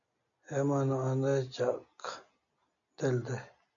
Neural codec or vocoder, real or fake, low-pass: none; real; 7.2 kHz